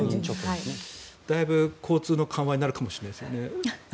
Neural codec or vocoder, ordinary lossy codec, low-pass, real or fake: none; none; none; real